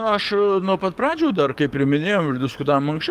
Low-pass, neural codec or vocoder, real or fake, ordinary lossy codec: 14.4 kHz; codec, 44.1 kHz, 7.8 kbps, DAC; fake; Opus, 32 kbps